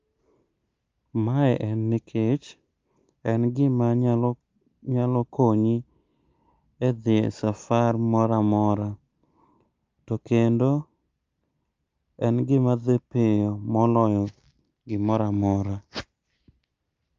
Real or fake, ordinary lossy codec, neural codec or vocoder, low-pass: real; Opus, 24 kbps; none; 7.2 kHz